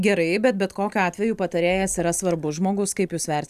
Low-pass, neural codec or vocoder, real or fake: 14.4 kHz; none; real